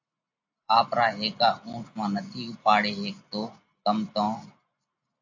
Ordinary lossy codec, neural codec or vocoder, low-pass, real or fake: AAC, 48 kbps; none; 7.2 kHz; real